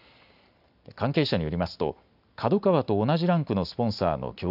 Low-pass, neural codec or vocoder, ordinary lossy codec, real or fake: 5.4 kHz; none; none; real